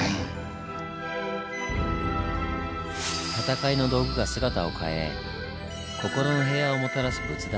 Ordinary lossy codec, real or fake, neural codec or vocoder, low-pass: none; real; none; none